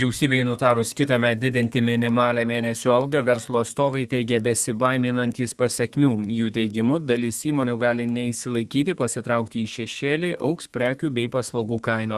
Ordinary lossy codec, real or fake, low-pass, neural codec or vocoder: Opus, 64 kbps; fake; 14.4 kHz; codec, 32 kHz, 1.9 kbps, SNAC